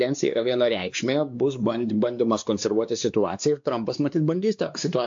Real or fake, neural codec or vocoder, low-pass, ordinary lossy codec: fake; codec, 16 kHz, 2 kbps, X-Codec, WavLM features, trained on Multilingual LibriSpeech; 7.2 kHz; AAC, 64 kbps